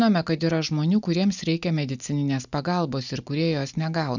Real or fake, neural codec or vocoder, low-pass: real; none; 7.2 kHz